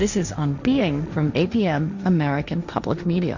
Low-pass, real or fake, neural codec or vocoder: 7.2 kHz; fake; codec, 16 kHz, 1.1 kbps, Voila-Tokenizer